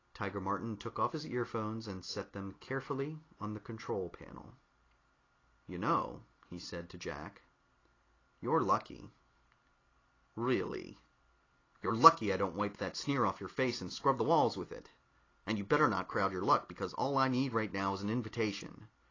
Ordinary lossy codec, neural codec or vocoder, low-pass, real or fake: AAC, 32 kbps; none; 7.2 kHz; real